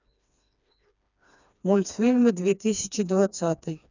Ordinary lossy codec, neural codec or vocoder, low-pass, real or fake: none; codec, 16 kHz, 2 kbps, FreqCodec, smaller model; 7.2 kHz; fake